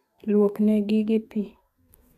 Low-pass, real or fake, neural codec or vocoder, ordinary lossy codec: 14.4 kHz; fake; codec, 32 kHz, 1.9 kbps, SNAC; none